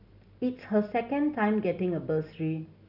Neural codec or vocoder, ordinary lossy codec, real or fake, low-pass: none; none; real; 5.4 kHz